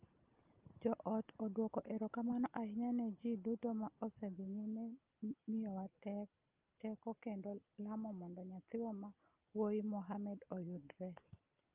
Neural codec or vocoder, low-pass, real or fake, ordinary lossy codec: none; 3.6 kHz; real; Opus, 24 kbps